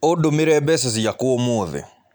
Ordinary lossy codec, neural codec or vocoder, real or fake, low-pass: none; none; real; none